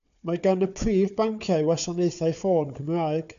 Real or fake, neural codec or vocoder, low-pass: fake; codec, 16 kHz, 4 kbps, FunCodec, trained on Chinese and English, 50 frames a second; 7.2 kHz